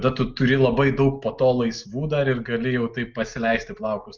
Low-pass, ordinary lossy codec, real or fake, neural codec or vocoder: 7.2 kHz; Opus, 24 kbps; real; none